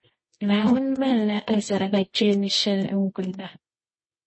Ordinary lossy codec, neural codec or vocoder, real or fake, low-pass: MP3, 32 kbps; codec, 24 kHz, 0.9 kbps, WavTokenizer, medium music audio release; fake; 9.9 kHz